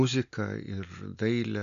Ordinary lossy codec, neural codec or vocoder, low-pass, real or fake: MP3, 96 kbps; none; 7.2 kHz; real